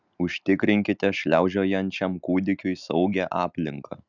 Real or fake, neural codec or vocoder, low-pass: real; none; 7.2 kHz